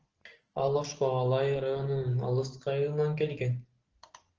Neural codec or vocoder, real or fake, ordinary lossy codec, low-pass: none; real; Opus, 16 kbps; 7.2 kHz